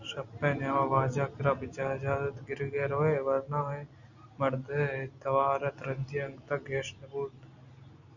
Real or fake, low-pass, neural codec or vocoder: real; 7.2 kHz; none